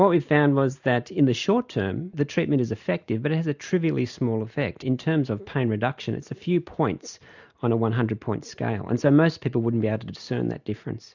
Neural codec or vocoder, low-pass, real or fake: none; 7.2 kHz; real